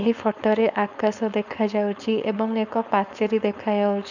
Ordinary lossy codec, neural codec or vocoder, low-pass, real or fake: none; codec, 16 kHz, 4.8 kbps, FACodec; 7.2 kHz; fake